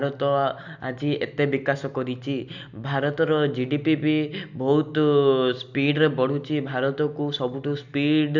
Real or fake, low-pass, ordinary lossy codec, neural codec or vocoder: real; 7.2 kHz; none; none